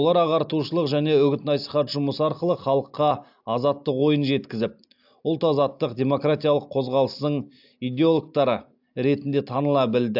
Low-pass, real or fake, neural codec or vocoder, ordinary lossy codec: 5.4 kHz; real; none; AAC, 48 kbps